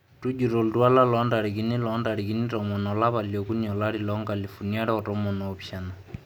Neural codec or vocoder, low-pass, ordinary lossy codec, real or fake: none; none; none; real